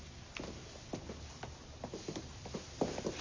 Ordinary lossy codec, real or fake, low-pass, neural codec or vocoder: MP3, 64 kbps; real; 7.2 kHz; none